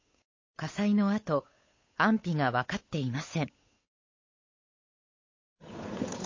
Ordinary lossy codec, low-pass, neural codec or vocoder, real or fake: MP3, 32 kbps; 7.2 kHz; codec, 16 kHz, 8 kbps, FunCodec, trained on Chinese and English, 25 frames a second; fake